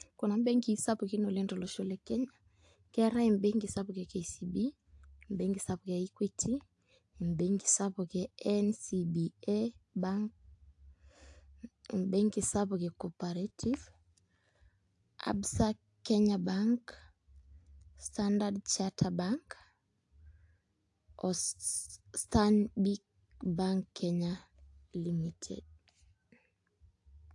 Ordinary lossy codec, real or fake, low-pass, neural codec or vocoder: AAC, 64 kbps; real; 10.8 kHz; none